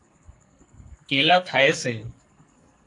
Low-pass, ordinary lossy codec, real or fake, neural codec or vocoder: 10.8 kHz; AAC, 64 kbps; fake; codec, 44.1 kHz, 2.6 kbps, SNAC